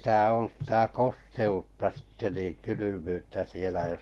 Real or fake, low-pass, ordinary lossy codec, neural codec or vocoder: fake; 19.8 kHz; Opus, 16 kbps; codec, 44.1 kHz, 7.8 kbps, Pupu-Codec